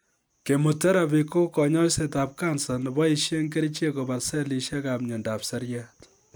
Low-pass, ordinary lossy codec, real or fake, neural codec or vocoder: none; none; real; none